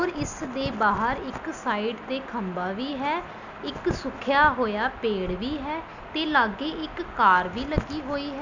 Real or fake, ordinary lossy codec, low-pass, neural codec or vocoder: real; none; 7.2 kHz; none